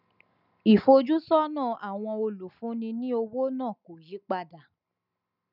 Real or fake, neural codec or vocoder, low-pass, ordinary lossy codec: real; none; 5.4 kHz; none